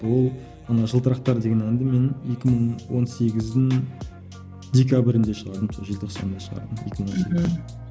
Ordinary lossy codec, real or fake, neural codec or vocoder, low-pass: none; real; none; none